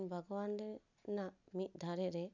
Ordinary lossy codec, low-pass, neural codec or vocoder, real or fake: none; 7.2 kHz; none; real